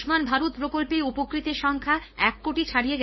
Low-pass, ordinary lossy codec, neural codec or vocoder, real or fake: 7.2 kHz; MP3, 24 kbps; codec, 16 kHz, 4.8 kbps, FACodec; fake